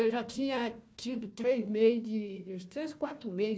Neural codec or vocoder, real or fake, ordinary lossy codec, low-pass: codec, 16 kHz, 1 kbps, FunCodec, trained on Chinese and English, 50 frames a second; fake; none; none